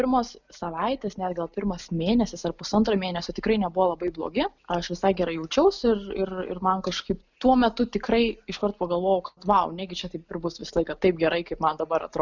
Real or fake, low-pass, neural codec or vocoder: real; 7.2 kHz; none